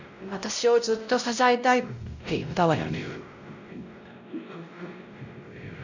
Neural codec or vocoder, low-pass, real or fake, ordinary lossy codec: codec, 16 kHz, 0.5 kbps, X-Codec, WavLM features, trained on Multilingual LibriSpeech; 7.2 kHz; fake; none